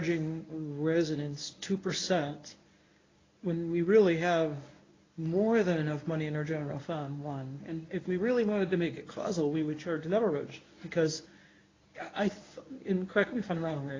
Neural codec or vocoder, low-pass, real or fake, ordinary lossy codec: codec, 24 kHz, 0.9 kbps, WavTokenizer, medium speech release version 1; 7.2 kHz; fake; AAC, 32 kbps